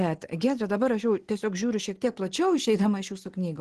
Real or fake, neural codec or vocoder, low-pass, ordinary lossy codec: real; none; 10.8 kHz; Opus, 16 kbps